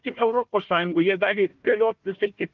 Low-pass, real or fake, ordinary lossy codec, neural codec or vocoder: 7.2 kHz; fake; Opus, 24 kbps; codec, 16 kHz, 1 kbps, FunCodec, trained on Chinese and English, 50 frames a second